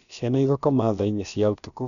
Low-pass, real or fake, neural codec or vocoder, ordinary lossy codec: 7.2 kHz; fake; codec, 16 kHz, about 1 kbps, DyCAST, with the encoder's durations; none